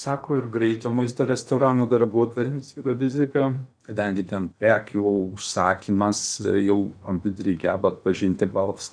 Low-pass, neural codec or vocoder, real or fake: 9.9 kHz; codec, 16 kHz in and 24 kHz out, 0.8 kbps, FocalCodec, streaming, 65536 codes; fake